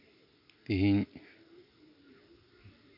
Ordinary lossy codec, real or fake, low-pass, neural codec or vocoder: none; real; 5.4 kHz; none